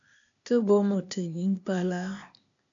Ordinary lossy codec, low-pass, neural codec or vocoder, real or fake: AAC, 64 kbps; 7.2 kHz; codec, 16 kHz, 0.8 kbps, ZipCodec; fake